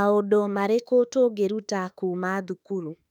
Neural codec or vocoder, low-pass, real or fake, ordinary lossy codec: autoencoder, 48 kHz, 32 numbers a frame, DAC-VAE, trained on Japanese speech; 19.8 kHz; fake; none